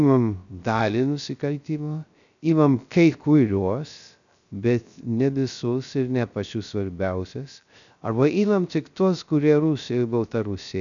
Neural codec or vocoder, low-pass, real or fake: codec, 16 kHz, 0.3 kbps, FocalCodec; 7.2 kHz; fake